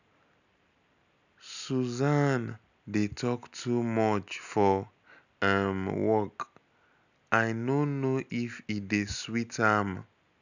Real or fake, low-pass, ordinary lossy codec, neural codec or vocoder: real; 7.2 kHz; none; none